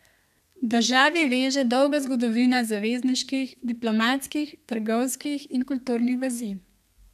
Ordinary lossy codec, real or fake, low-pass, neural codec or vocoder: none; fake; 14.4 kHz; codec, 32 kHz, 1.9 kbps, SNAC